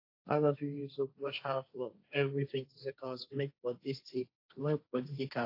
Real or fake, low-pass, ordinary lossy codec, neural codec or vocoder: fake; 5.4 kHz; AAC, 32 kbps; codec, 16 kHz, 1.1 kbps, Voila-Tokenizer